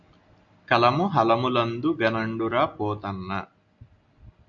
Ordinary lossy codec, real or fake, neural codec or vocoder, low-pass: MP3, 96 kbps; real; none; 7.2 kHz